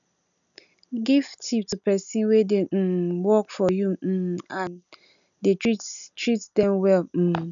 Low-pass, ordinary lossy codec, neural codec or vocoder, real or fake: 7.2 kHz; none; none; real